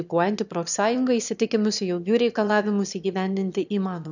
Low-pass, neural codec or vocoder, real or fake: 7.2 kHz; autoencoder, 22.05 kHz, a latent of 192 numbers a frame, VITS, trained on one speaker; fake